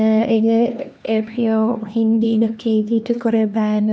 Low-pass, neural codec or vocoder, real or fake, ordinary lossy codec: none; codec, 16 kHz, 2 kbps, X-Codec, HuBERT features, trained on LibriSpeech; fake; none